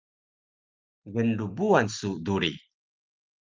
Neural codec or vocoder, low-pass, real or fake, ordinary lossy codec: none; 7.2 kHz; real; Opus, 32 kbps